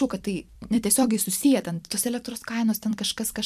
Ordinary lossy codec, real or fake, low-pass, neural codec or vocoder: MP3, 96 kbps; fake; 14.4 kHz; vocoder, 44.1 kHz, 128 mel bands every 256 samples, BigVGAN v2